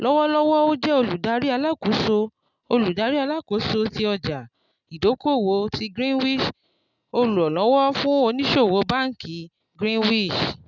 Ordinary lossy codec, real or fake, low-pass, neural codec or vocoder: none; real; 7.2 kHz; none